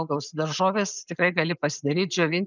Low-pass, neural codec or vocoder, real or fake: 7.2 kHz; none; real